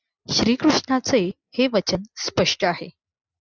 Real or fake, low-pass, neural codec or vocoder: real; 7.2 kHz; none